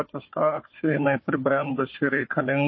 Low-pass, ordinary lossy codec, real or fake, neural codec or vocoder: 7.2 kHz; MP3, 24 kbps; fake; codec, 16 kHz, 4 kbps, FunCodec, trained on Chinese and English, 50 frames a second